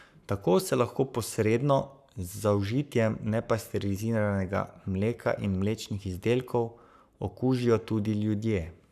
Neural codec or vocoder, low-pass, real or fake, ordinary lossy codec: codec, 44.1 kHz, 7.8 kbps, Pupu-Codec; 14.4 kHz; fake; none